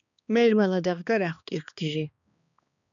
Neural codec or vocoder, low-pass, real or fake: codec, 16 kHz, 2 kbps, X-Codec, HuBERT features, trained on balanced general audio; 7.2 kHz; fake